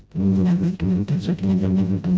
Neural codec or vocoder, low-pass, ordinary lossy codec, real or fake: codec, 16 kHz, 0.5 kbps, FreqCodec, smaller model; none; none; fake